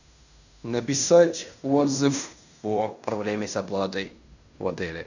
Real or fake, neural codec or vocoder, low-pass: fake; codec, 16 kHz in and 24 kHz out, 0.9 kbps, LongCat-Audio-Codec, fine tuned four codebook decoder; 7.2 kHz